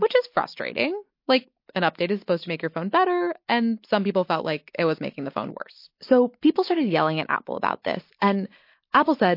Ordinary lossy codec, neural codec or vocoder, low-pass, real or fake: MP3, 32 kbps; none; 5.4 kHz; real